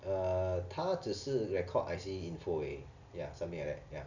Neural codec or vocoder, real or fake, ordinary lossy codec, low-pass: none; real; none; 7.2 kHz